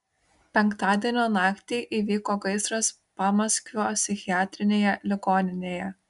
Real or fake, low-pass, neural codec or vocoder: real; 10.8 kHz; none